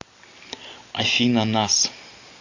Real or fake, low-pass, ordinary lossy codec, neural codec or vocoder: real; 7.2 kHz; AAC, 48 kbps; none